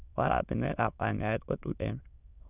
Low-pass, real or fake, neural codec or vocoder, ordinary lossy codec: 3.6 kHz; fake; autoencoder, 22.05 kHz, a latent of 192 numbers a frame, VITS, trained on many speakers; none